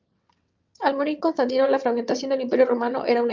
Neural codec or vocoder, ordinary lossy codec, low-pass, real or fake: vocoder, 22.05 kHz, 80 mel bands, WaveNeXt; Opus, 24 kbps; 7.2 kHz; fake